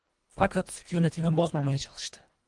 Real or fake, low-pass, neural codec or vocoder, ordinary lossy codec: fake; 10.8 kHz; codec, 24 kHz, 1.5 kbps, HILCodec; Opus, 24 kbps